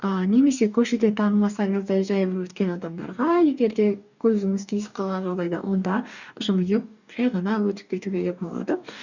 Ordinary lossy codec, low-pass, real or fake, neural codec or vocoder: none; 7.2 kHz; fake; codec, 44.1 kHz, 2.6 kbps, DAC